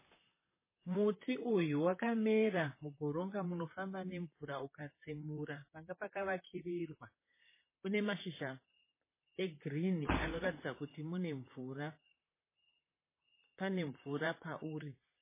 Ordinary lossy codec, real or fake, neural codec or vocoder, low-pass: MP3, 16 kbps; fake; vocoder, 44.1 kHz, 128 mel bands, Pupu-Vocoder; 3.6 kHz